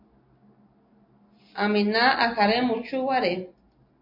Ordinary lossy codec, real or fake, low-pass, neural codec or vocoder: MP3, 32 kbps; real; 5.4 kHz; none